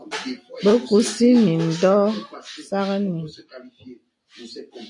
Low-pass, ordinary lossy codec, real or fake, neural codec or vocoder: 10.8 kHz; AAC, 64 kbps; real; none